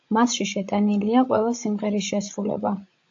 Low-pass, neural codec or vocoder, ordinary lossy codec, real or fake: 7.2 kHz; codec, 16 kHz, 16 kbps, FreqCodec, larger model; AAC, 48 kbps; fake